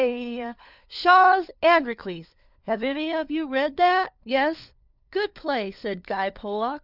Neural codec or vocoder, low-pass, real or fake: codec, 16 kHz, 4 kbps, FunCodec, trained on LibriTTS, 50 frames a second; 5.4 kHz; fake